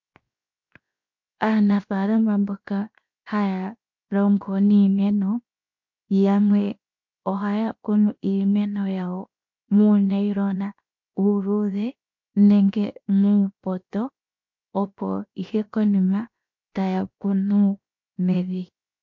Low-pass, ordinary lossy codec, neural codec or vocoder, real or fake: 7.2 kHz; MP3, 48 kbps; codec, 16 kHz, 0.7 kbps, FocalCodec; fake